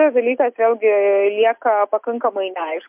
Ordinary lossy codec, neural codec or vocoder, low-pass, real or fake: MP3, 32 kbps; none; 3.6 kHz; real